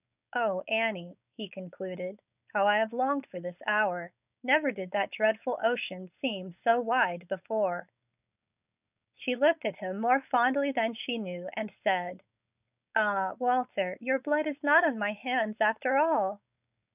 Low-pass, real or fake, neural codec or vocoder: 3.6 kHz; fake; codec, 16 kHz, 4.8 kbps, FACodec